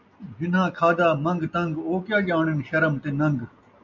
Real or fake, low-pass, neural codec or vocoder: real; 7.2 kHz; none